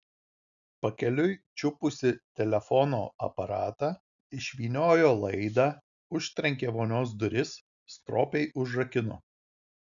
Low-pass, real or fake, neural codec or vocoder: 7.2 kHz; real; none